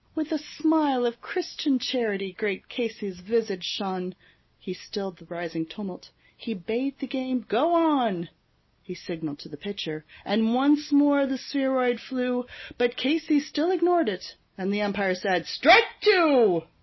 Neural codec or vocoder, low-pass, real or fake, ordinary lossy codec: none; 7.2 kHz; real; MP3, 24 kbps